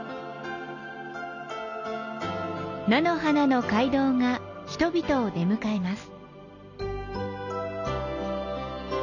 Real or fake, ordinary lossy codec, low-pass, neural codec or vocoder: real; none; 7.2 kHz; none